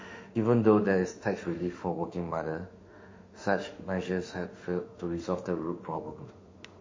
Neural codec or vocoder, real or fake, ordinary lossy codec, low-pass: autoencoder, 48 kHz, 32 numbers a frame, DAC-VAE, trained on Japanese speech; fake; MP3, 32 kbps; 7.2 kHz